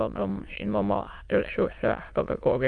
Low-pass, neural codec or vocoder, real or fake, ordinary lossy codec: 9.9 kHz; autoencoder, 22.05 kHz, a latent of 192 numbers a frame, VITS, trained on many speakers; fake; Opus, 32 kbps